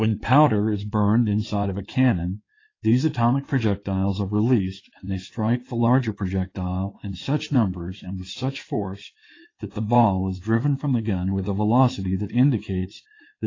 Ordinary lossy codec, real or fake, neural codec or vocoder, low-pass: AAC, 32 kbps; fake; codec, 16 kHz in and 24 kHz out, 2.2 kbps, FireRedTTS-2 codec; 7.2 kHz